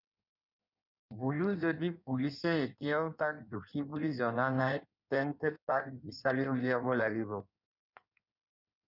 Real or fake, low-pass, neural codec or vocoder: fake; 5.4 kHz; codec, 16 kHz in and 24 kHz out, 1.1 kbps, FireRedTTS-2 codec